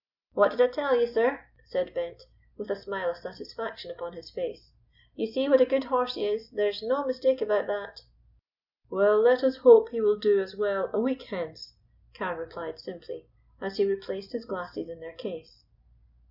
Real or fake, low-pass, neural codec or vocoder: real; 5.4 kHz; none